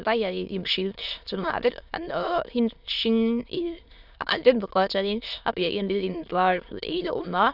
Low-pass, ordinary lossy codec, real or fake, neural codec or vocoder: 5.4 kHz; none; fake; autoencoder, 22.05 kHz, a latent of 192 numbers a frame, VITS, trained on many speakers